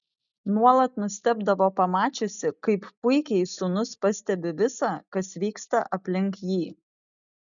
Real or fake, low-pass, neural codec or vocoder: real; 7.2 kHz; none